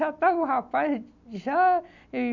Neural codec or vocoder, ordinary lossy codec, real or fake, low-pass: none; none; real; 7.2 kHz